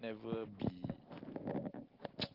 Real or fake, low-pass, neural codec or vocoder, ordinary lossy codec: real; 5.4 kHz; none; Opus, 16 kbps